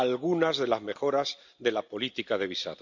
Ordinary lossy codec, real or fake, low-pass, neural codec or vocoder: MP3, 64 kbps; real; 7.2 kHz; none